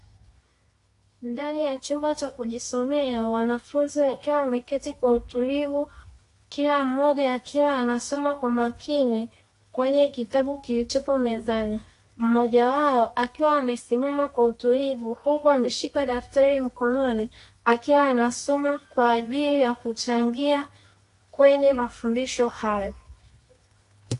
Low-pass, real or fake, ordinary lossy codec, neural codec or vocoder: 10.8 kHz; fake; AAC, 48 kbps; codec, 24 kHz, 0.9 kbps, WavTokenizer, medium music audio release